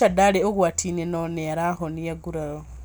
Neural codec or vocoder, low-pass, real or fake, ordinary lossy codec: none; none; real; none